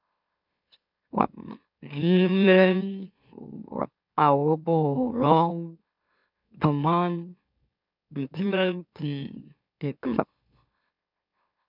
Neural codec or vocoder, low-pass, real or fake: autoencoder, 44.1 kHz, a latent of 192 numbers a frame, MeloTTS; 5.4 kHz; fake